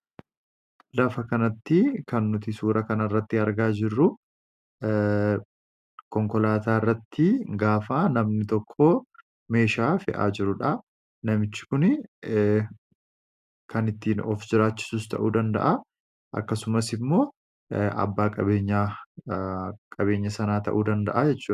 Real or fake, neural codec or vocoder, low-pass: real; none; 14.4 kHz